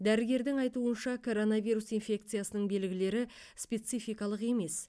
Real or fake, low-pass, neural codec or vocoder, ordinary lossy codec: real; none; none; none